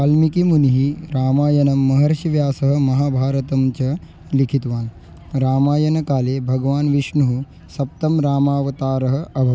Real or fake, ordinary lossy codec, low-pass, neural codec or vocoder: real; none; none; none